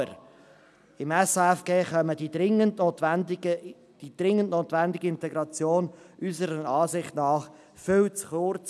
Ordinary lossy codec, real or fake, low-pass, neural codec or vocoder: none; real; none; none